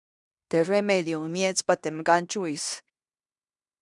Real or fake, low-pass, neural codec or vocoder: fake; 10.8 kHz; codec, 16 kHz in and 24 kHz out, 0.9 kbps, LongCat-Audio-Codec, fine tuned four codebook decoder